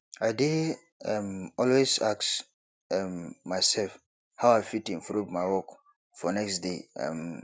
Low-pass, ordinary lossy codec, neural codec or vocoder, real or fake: none; none; none; real